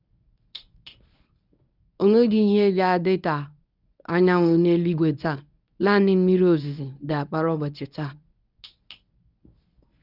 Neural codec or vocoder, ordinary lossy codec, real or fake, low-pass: codec, 24 kHz, 0.9 kbps, WavTokenizer, medium speech release version 1; none; fake; 5.4 kHz